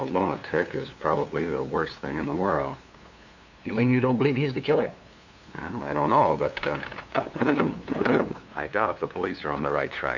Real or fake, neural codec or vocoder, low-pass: fake; codec, 16 kHz, 2 kbps, FunCodec, trained on LibriTTS, 25 frames a second; 7.2 kHz